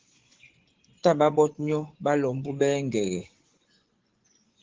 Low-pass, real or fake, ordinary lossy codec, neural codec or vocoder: 7.2 kHz; fake; Opus, 16 kbps; vocoder, 24 kHz, 100 mel bands, Vocos